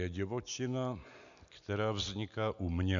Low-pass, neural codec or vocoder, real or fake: 7.2 kHz; none; real